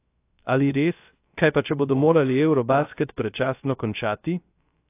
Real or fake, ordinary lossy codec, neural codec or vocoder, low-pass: fake; AAC, 24 kbps; codec, 16 kHz, 0.3 kbps, FocalCodec; 3.6 kHz